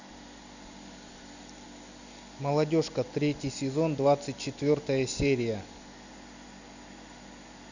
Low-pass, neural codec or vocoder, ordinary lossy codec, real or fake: 7.2 kHz; none; none; real